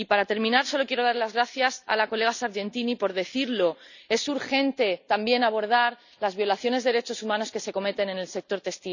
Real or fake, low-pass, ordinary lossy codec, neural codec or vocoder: real; 7.2 kHz; none; none